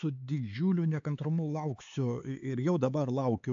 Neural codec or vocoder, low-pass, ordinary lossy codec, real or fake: codec, 16 kHz, 4 kbps, X-Codec, HuBERT features, trained on LibriSpeech; 7.2 kHz; AAC, 64 kbps; fake